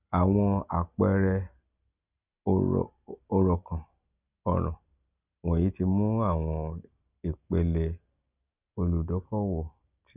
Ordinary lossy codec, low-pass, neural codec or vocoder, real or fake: none; 3.6 kHz; none; real